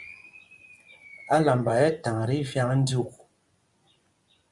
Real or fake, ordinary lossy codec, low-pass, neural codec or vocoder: fake; Opus, 64 kbps; 10.8 kHz; vocoder, 24 kHz, 100 mel bands, Vocos